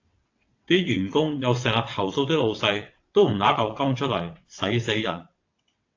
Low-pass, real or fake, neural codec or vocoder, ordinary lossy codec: 7.2 kHz; fake; vocoder, 22.05 kHz, 80 mel bands, WaveNeXt; AAC, 48 kbps